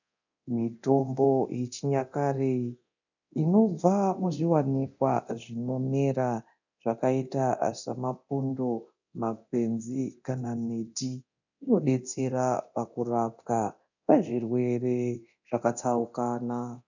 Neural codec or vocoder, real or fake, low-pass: codec, 24 kHz, 0.9 kbps, DualCodec; fake; 7.2 kHz